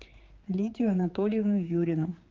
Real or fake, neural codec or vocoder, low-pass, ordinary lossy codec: fake; codec, 16 kHz, 4 kbps, X-Codec, HuBERT features, trained on general audio; 7.2 kHz; Opus, 32 kbps